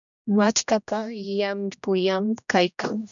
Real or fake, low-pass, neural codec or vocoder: fake; 7.2 kHz; codec, 16 kHz, 1 kbps, X-Codec, HuBERT features, trained on general audio